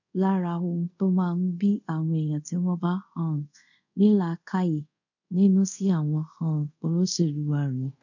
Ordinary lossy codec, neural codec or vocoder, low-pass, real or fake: none; codec, 24 kHz, 0.5 kbps, DualCodec; 7.2 kHz; fake